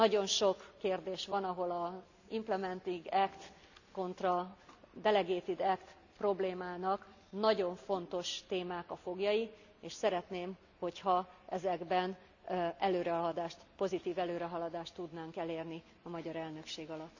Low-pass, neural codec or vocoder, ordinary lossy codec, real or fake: 7.2 kHz; none; none; real